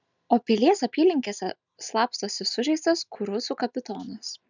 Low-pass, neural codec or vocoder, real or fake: 7.2 kHz; none; real